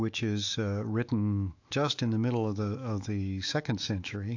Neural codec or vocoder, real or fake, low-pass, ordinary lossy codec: autoencoder, 48 kHz, 128 numbers a frame, DAC-VAE, trained on Japanese speech; fake; 7.2 kHz; AAC, 48 kbps